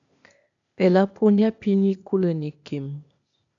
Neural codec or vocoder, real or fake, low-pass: codec, 16 kHz, 0.8 kbps, ZipCodec; fake; 7.2 kHz